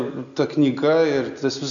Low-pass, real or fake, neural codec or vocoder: 7.2 kHz; real; none